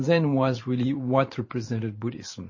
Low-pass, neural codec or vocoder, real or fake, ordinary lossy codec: 7.2 kHz; vocoder, 44.1 kHz, 128 mel bands every 512 samples, BigVGAN v2; fake; MP3, 32 kbps